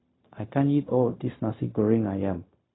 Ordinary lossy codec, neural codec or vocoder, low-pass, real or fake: AAC, 16 kbps; codec, 16 kHz, 0.4 kbps, LongCat-Audio-Codec; 7.2 kHz; fake